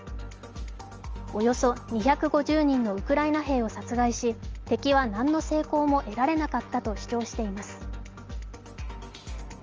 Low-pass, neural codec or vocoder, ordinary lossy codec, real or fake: 7.2 kHz; none; Opus, 24 kbps; real